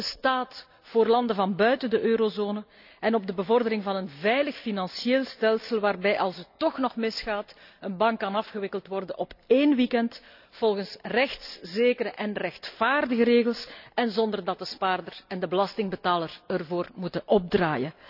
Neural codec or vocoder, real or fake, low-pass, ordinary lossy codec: none; real; 5.4 kHz; none